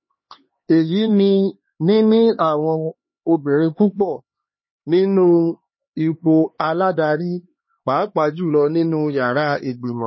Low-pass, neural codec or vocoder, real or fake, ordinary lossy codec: 7.2 kHz; codec, 16 kHz, 2 kbps, X-Codec, HuBERT features, trained on LibriSpeech; fake; MP3, 24 kbps